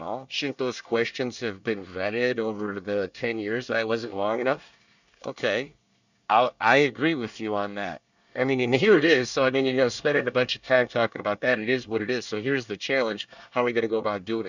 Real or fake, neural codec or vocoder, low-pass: fake; codec, 24 kHz, 1 kbps, SNAC; 7.2 kHz